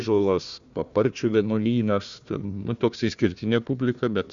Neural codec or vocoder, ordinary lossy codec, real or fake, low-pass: codec, 16 kHz, 1 kbps, FunCodec, trained on Chinese and English, 50 frames a second; Opus, 64 kbps; fake; 7.2 kHz